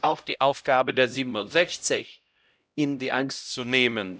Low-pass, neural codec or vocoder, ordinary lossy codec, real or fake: none; codec, 16 kHz, 0.5 kbps, X-Codec, HuBERT features, trained on LibriSpeech; none; fake